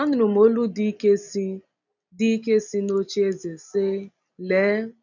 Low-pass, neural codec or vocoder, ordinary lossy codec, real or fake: 7.2 kHz; none; none; real